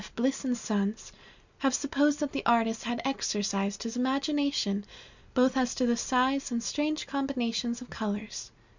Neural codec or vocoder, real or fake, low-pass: none; real; 7.2 kHz